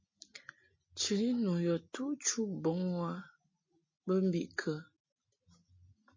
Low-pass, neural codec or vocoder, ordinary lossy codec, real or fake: 7.2 kHz; none; MP3, 32 kbps; real